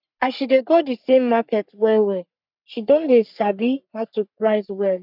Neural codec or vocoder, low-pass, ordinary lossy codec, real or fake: codec, 44.1 kHz, 3.4 kbps, Pupu-Codec; 5.4 kHz; none; fake